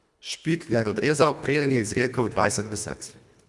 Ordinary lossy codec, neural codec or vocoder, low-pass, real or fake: none; codec, 24 kHz, 1.5 kbps, HILCodec; none; fake